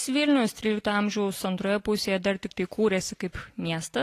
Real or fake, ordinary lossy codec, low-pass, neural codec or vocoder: real; AAC, 48 kbps; 14.4 kHz; none